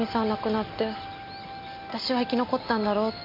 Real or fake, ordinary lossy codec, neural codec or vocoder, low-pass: real; MP3, 32 kbps; none; 5.4 kHz